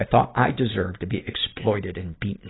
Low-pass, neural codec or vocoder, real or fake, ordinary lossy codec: 7.2 kHz; none; real; AAC, 16 kbps